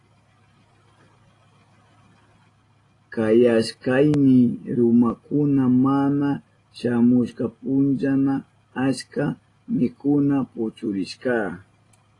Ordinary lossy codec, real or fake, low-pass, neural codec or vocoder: AAC, 32 kbps; real; 10.8 kHz; none